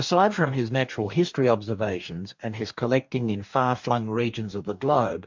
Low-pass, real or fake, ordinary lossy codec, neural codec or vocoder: 7.2 kHz; fake; MP3, 64 kbps; codec, 44.1 kHz, 2.6 kbps, DAC